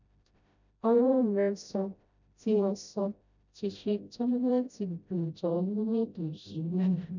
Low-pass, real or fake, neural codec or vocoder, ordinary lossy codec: 7.2 kHz; fake; codec, 16 kHz, 0.5 kbps, FreqCodec, smaller model; none